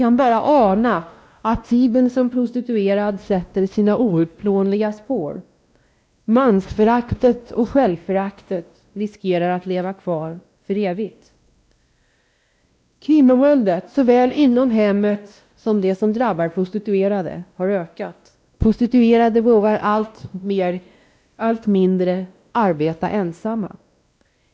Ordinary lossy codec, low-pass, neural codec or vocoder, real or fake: none; none; codec, 16 kHz, 1 kbps, X-Codec, WavLM features, trained on Multilingual LibriSpeech; fake